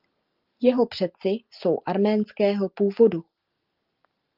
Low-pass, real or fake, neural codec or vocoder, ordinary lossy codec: 5.4 kHz; real; none; Opus, 32 kbps